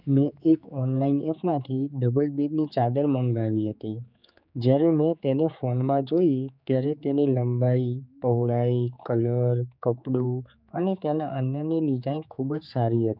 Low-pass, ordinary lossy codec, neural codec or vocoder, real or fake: 5.4 kHz; none; codec, 16 kHz, 4 kbps, X-Codec, HuBERT features, trained on general audio; fake